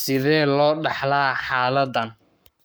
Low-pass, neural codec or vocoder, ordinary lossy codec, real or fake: none; codec, 44.1 kHz, 7.8 kbps, Pupu-Codec; none; fake